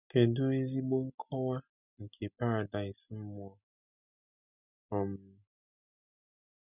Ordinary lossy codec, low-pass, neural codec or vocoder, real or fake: none; 3.6 kHz; none; real